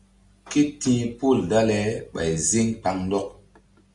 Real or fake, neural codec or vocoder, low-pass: real; none; 10.8 kHz